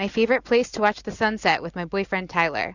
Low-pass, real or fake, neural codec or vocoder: 7.2 kHz; real; none